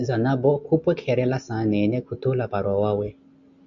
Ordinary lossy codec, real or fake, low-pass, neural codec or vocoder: MP3, 96 kbps; real; 7.2 kHz; none